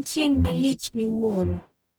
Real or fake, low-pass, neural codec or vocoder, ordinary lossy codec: fake; none; codec, 44.1 kHz, 0.9 kbps, DAC; none